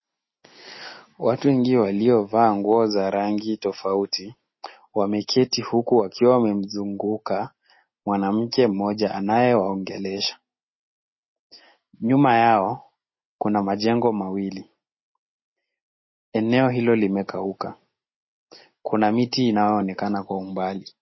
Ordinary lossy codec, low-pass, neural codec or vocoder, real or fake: MP3, 24 kbps; 7.2 kHz; none; real